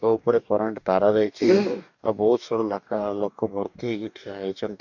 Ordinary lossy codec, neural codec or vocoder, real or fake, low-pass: none; codec, 44.1 kHz, 2.6 kbps, DAC; fake; 7.2 kHz